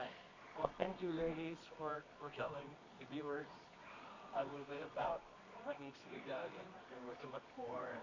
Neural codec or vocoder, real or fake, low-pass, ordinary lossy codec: codec, 24 kHz, 0.9 kbps, WavTokenizer, medium music audio release; fake; 7.2 kHz; MP3, 48 kbps